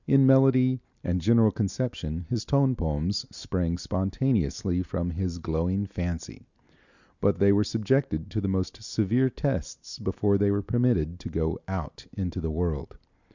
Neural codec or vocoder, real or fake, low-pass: none; real; 7.2 kHz